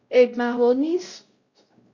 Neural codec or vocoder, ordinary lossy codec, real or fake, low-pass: codec, 16 kHz, 0.5 kbps, X-Codec, WavLM features, trained on Multilingual LibriSpeech; Opus, 64 kbps; fake; 7.2 kHz